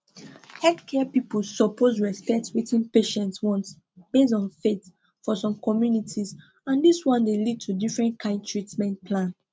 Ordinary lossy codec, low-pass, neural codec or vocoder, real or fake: none; none; none; real